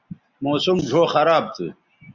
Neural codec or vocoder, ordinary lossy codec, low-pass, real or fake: none; Opus, 64 kbps; 7.2 kHz; real